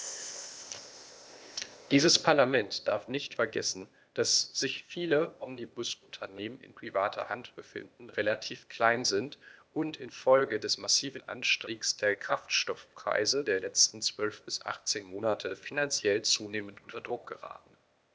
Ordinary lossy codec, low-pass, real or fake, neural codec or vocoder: none; none; fake; codec, 16 kHz, 0.8 kbps, ZipCodec